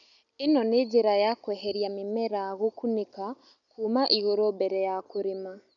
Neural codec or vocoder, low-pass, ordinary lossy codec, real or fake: none; 7.2 kHz; none; real